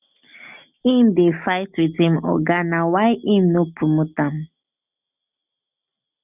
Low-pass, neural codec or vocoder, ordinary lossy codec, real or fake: 3.6 kHz; none; none; real